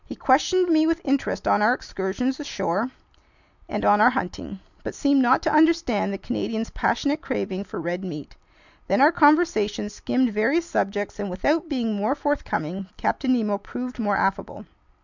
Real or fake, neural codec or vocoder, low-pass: real; none; 7.2 kHz